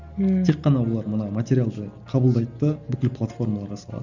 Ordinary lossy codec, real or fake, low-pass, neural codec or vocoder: none; real; 7.2 kHz; none